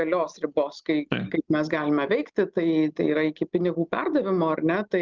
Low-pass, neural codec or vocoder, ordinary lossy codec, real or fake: 7.2 kHz; none; Opus, 24 kbps; real